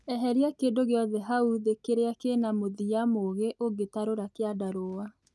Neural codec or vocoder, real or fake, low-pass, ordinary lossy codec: none; real; none; none